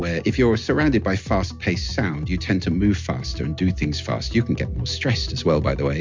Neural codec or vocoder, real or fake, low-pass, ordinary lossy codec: none; real; 7.2 kHz; MP3, 64 kbps